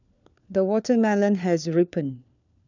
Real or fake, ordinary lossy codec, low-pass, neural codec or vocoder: fake; none; 7.2 kHz; codec, 16 kHz, 4 kbps, FunCodec, trained on LibriTTS, 50 frames a second